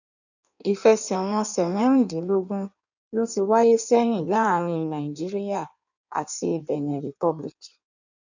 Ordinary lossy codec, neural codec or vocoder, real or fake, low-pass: none; codec, 16 kHz in and 24 kHz out, 1.1 kbps, FireRedTTS-2 codec; fake; 7.2 kHz